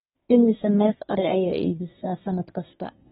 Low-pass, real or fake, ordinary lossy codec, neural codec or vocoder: 10.8 kHz; fake; AAC, 16 kbps; codec, 24 kHz, 1 kbps, SNAC